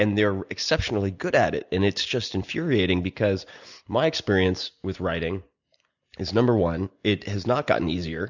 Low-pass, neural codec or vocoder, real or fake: 7.2 kHz; none; real